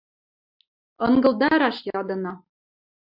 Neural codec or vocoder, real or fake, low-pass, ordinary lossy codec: none; real; 5.4 kHz; MP3, 48 kbps